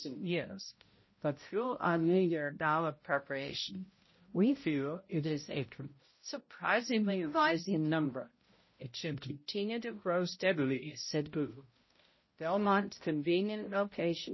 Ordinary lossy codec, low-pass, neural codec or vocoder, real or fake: MP3, 24 kbps; 7.2 kHz; codec, 16 kHz, 0.5 kbps, X-Codec, HuBERT features, trained on balanced general audio; fake